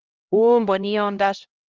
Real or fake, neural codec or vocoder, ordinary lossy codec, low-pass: fake; codec, 16 kHz, 0.5 kbps, X-Codec, HuBERT features, trained on LibriSpeech; Opus, 32 kbps; 7.2 kHz